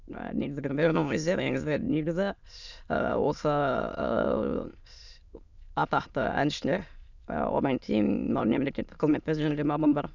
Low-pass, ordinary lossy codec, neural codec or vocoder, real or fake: 7.2 kHz; none; autoencoder, 22.05 kHz, a latent of 192 numbers a frame, VITS, trained on many speakers; fake